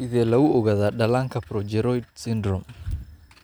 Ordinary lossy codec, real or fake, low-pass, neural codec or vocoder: none; real; none; none